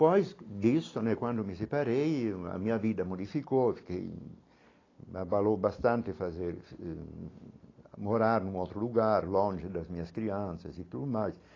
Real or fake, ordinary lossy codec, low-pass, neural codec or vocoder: real; AAC, 32 kbps; 7.2 kHz; none